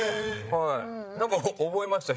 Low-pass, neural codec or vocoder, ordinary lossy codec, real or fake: none; codec, 16 kHz, 16 kbps, FreqCodec, larger model; none; fake